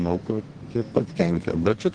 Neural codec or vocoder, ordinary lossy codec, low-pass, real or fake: codec, 32 kHz, 1.9 kbps, SNAC; Opus, 16 kbps; 9.9 kHz; fake